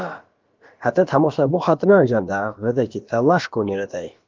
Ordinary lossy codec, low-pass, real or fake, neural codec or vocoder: Opus, 32 kbps; 7.2 kHz; fake; codec, 16 kHz, about 1 kbps, DyCAST, with the encoder's durations